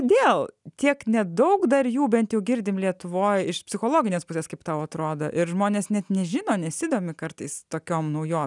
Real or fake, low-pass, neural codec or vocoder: real; 10.8 kHz; none